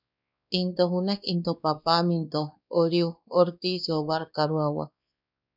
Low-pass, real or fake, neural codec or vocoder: 5.4 kHz; fake; codec, 16 kHz, 2 kbps, X-Codec, WavLM features, trained on Multilingual LibriSpeech